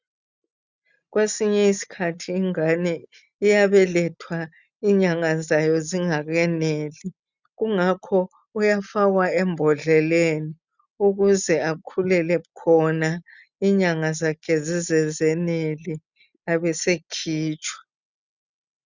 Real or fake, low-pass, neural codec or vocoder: real; 7.2 kHz; none